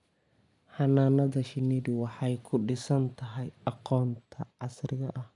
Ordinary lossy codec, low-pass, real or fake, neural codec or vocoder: none; 10.8 kHz; real; none